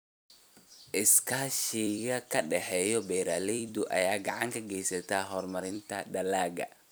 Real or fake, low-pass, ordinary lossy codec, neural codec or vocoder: fake; none; none; vocoder, 44.1 kHz, 128 mel bands every 256 samples, BigVGAN v2